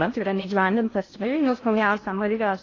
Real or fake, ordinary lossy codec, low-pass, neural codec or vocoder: fake; AAC, 32 kbps; 7.2 kHz; codec, 16 kHz in and 24 kHz out, 0.6 kbps, FocalCodec, streaming, 4096 codes